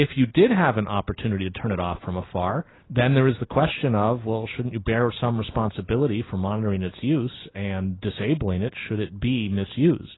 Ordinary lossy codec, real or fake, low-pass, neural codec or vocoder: AAC, 16 kbps; real; 7.2 kHz; none